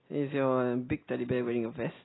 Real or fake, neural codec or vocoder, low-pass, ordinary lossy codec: real; none; 7.2 kHz; AAC, 16 kbps